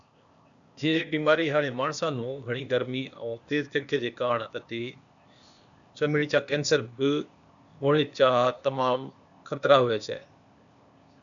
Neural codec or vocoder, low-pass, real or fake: codec, 16 kHz, 0.8 kbps, ZipCodec; 7.2 kHz; fake